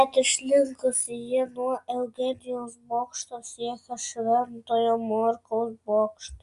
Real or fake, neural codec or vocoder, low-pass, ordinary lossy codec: real; none; 10.8 kHz; Opus, 64 kbps